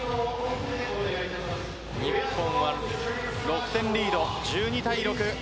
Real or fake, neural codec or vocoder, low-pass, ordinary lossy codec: real; none; none; none